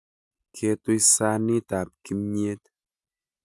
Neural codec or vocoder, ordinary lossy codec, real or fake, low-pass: none; none; real; none